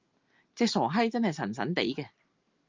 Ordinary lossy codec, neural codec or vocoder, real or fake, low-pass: Opus, 24 kbps; none; real; 7.2 kHz